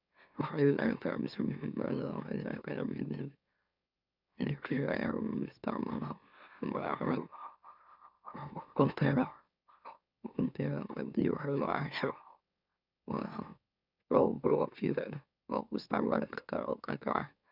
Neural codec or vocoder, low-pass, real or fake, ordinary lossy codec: autoencoder, 44.1 kHz, a latent of 192 numbers a frame, MeloTTS; 5.4 kHz; fake; none